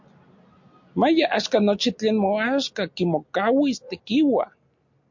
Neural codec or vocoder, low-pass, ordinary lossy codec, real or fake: none; 7.2 kHz; MP3, 48 kbps; real